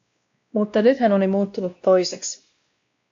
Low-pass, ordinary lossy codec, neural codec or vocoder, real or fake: 7.2 kHz; AAC, 64 kbps; codec, 16 kHz, 1 kbps, X-Codec, WavLM features, trained on Multilingual LibriSpeech; fake